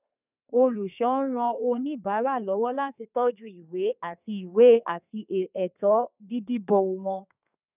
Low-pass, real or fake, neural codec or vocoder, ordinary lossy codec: 3.6 kHz; fake; codec, 32 kHz, 1.9 kbps, SNAC; none